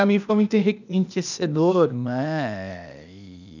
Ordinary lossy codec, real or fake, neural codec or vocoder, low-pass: none; fake; codec, 16 kHz, 0.8 kbps, ZipCodec; 7.2 kHz